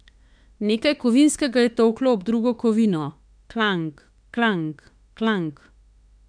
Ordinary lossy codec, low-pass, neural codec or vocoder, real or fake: none; 9.9 kHz; autoencoder, 48 kHz, 32 numbers a frame, DAC-VAE, trained on Japanese speech; fake